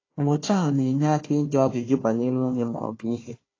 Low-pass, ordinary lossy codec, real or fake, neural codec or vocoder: 7.2 kHz; AAC, 32 kbps; fake; codec, 16 kHz, 1 kbps, FunCodec, trained on Chinese and English, 50 frames a second